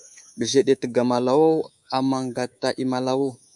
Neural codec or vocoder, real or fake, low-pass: codec, 24 kHz, 3.1 kbps, DualCodec; fake; 10.8 kHz